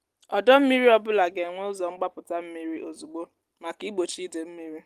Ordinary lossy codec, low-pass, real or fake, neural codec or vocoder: Opus, 24 kbps; 14.4 kHz; real; none